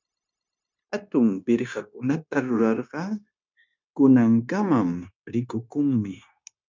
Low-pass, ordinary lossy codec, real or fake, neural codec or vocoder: 7.2 kHz; MP3, 64 kbps; fake; codec, 16 kHz, 0.9 kbps, LongCat-Audio-Codec